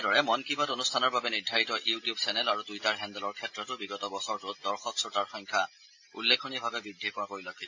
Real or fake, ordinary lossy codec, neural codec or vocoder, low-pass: real; AAC, 48 kbps; none; 7.2 kHz